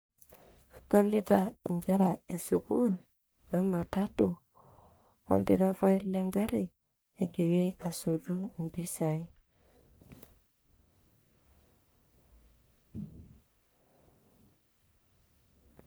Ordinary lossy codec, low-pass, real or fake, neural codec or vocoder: none; none; fake; codec, 44.1 kHz, 1.7 kbps, Pupu-Codec